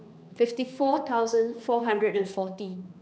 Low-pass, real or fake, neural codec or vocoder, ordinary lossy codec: none; fake; codec, 16 kHz, 2 kbps, X-Codec, HuBERT features, trained on balanced general audio; none